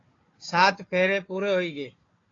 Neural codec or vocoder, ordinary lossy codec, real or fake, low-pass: codec, 16 kHz, 4 kbps, FunCodec, trained on Chinese and English, 50 frames a second; AAC, 32 kbps; fake; 7.2 kHz